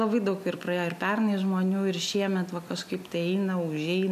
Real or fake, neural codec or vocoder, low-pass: real; none; 14.4 kHz